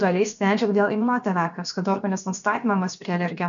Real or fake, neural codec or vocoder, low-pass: fake; codec, 16 kHz, about 1 kbps, DyCAST, with the encoder's durations; 7.2 kHz